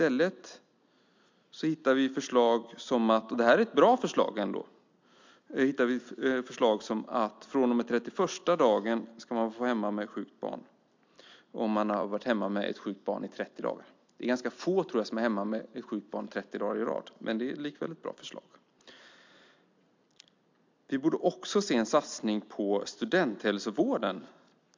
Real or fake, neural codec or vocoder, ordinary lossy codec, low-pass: real; none; MP3, 64 kbps; 7.2 kHz